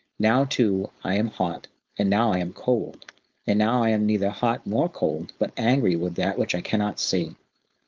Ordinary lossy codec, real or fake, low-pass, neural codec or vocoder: Opus, 32 kbps; fake; 7.2 kHz; codec, 16 kHz, 4.8 kbps, FACodec